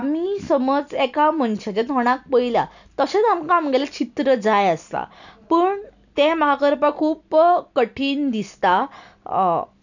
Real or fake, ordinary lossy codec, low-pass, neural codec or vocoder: real; none; 7.2 kHz; none